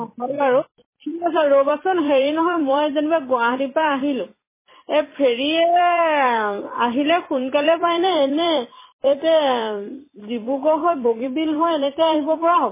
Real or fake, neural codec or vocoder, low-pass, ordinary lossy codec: fake; vocoder, 44.1 kHz, 128 mel bands every 256 samples, BigVGAN v2; 3.6 kHz; MP3, 16 kbps